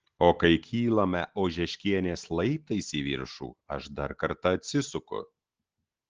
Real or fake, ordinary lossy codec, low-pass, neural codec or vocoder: real; Opus, 16 kbps; 7.2 kHz; none